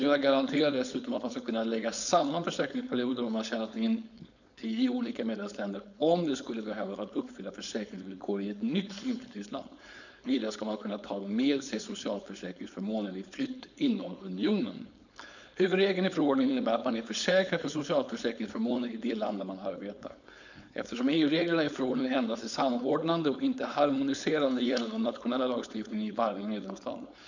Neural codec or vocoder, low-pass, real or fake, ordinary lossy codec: codec, 16 kHz, 4.8 kbps, FACodec; 7.2 kHz; fake; none